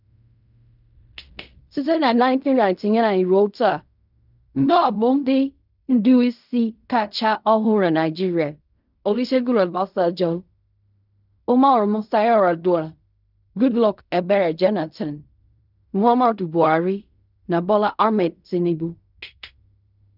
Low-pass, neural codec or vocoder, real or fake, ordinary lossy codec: 5.4 kHz; codec, 16 kHz in and 24 kHz out, 0.4 kbps, LongCat-Audio-Codec, fine tuned four codebook decoder; fake; none